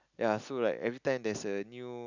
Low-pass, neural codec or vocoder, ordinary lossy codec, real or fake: 7.2 kHz; none; none; real